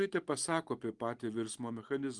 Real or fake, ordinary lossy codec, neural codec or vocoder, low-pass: real; Opus, 24 kbps; none; 10.8 kHz